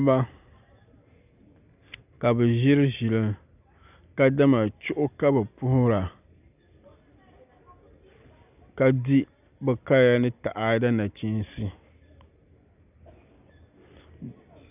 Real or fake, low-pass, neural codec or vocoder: real; 3.6 kHz; none